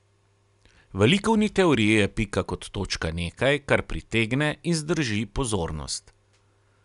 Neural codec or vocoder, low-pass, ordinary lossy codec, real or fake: none; 10.8 kHz; none; real